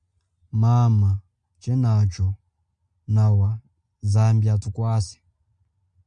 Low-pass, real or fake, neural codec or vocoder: 9.9 kHz; real; none